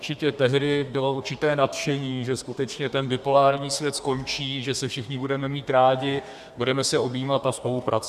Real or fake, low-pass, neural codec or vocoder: fake; 14.4 kHz; codec, 32 kHz, 1.9 kbps, SNAC